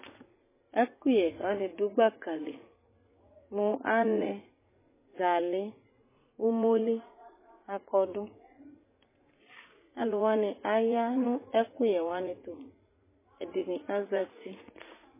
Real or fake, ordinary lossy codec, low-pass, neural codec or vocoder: fake; MP3, 16 kbps; 3.6 kHz; vocoder, 44.1 kHz, 80 mel bands, Vocos